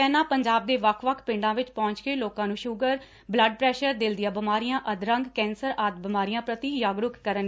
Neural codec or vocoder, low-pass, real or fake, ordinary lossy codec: none; none; real; none